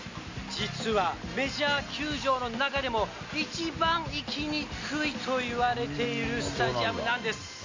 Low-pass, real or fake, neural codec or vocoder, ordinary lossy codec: 7.2 kHz; real; none; MP3, 64 kbps